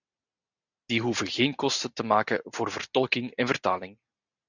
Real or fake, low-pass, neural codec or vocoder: real; 7.2 kHz; none